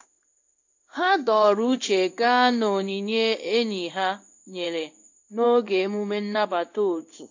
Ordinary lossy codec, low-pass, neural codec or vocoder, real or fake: AAC, 48 kbps; 7.2 kHz; codec, 16 kHz in and 24 kHz out, 1 kbps, XY-Tokenizer; fake